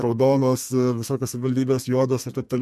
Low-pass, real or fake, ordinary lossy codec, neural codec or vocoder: 14.4 kHz; fake; MP3, 64 kbps; codec, 32 kHz, 1.9 kbps, SNAC